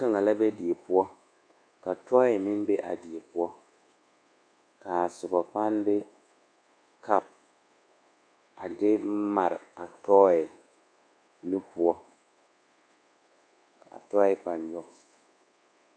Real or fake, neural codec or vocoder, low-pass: fake; codec, 24 kHz, 1.2 kbps, DualCodec; 9.9 kHz